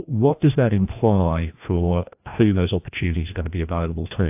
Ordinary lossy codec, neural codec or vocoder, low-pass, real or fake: AAC, 32 kbps; codec, 16 kHz, 1 kbps, FreqCodec, larger model; 3.6 kHz; fake